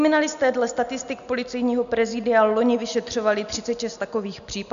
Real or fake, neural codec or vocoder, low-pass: real; none; 7.2 kHz